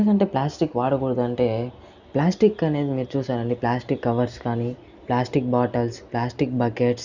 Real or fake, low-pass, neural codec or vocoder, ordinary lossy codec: real; 7.2 kHz; none; none